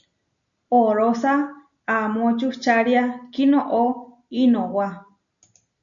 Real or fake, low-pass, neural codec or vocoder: real; 7.2 kHz; none